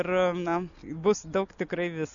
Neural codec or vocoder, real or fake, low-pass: none; real; 7.2 kHz